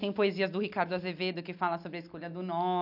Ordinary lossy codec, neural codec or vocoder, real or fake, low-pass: none; none; real; 5.4 kHz